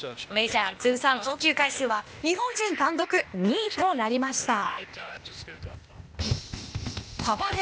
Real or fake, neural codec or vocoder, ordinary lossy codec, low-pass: fake; codec, 16 kHz, 0.8 kbps, ZipCodec; none; none